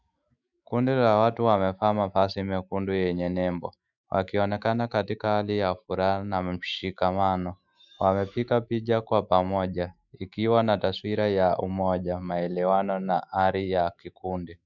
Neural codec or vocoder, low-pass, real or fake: autoencoder, 48 kHz, 128 numbers a frame, DAC-VAE, trained on Japanese speech; 7.2 kHz; fake